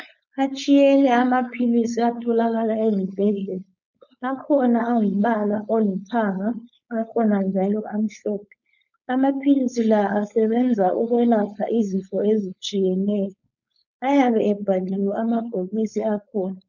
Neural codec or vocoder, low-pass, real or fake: codec, 16 kHz, 4.8 kbps, FACodec; 7.2 kHz; fake